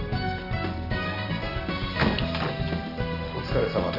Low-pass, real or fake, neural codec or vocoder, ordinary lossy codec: 5.4 kHz; real; none; none